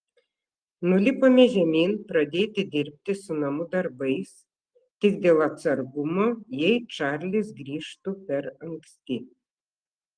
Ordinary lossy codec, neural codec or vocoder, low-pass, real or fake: Opus, 24 kbps; none; 9.9 kHz; real